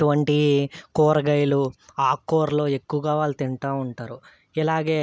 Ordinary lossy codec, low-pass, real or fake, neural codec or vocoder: none; none; real; none